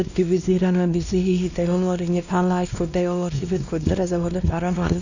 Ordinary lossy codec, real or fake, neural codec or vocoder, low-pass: none; fake; codec, 16 kHz, 1 kbps, X-Codec, WavLM features, trained on Multilingual LibriSpeech; 7.2 kHz